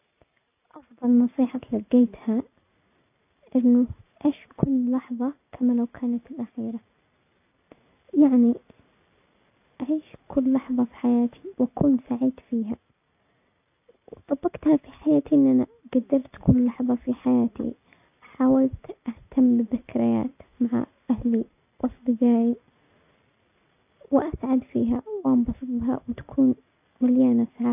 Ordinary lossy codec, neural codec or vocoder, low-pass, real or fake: none; none; 3.6 kHz; real